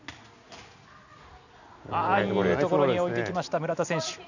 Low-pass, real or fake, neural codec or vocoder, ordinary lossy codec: 7.2 kHz; real; none; none